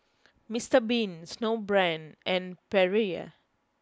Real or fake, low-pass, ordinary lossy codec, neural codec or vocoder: real; none; none; none